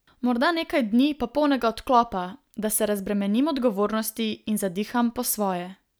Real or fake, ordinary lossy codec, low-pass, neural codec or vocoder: real; none; none; none